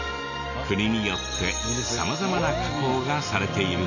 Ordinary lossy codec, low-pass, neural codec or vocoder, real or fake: none; 7.2 kHz; none; real